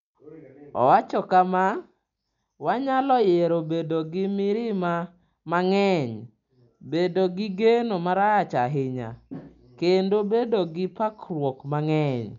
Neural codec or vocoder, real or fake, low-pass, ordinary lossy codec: none; real; 7.2 kHz; none